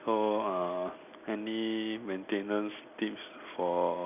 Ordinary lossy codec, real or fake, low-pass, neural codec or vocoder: none; real; 3.6 kHz; none